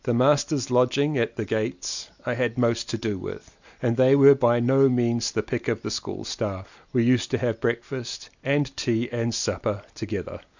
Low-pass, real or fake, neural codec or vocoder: 7.2 kHz; real; none